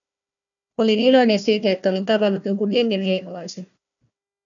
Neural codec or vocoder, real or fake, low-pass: codec, 16 kHz, 1 kbps, FunCodec, trained on Chinese and English, 50 frames a second; fake; 7.2 kHz